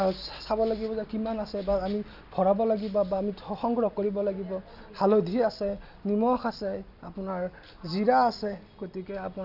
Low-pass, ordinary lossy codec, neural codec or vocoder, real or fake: 5.4 kHz; none; none; real